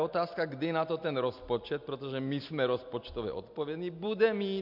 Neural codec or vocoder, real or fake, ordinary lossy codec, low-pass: none; real; MP3, 48 kbps; 5.4 kHz